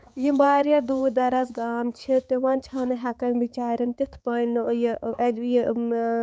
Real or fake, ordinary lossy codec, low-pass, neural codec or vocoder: fake; none; none; codec, 16 kHz, 4 kbps, X-Codec, HuBERT features, trained on balanced general audio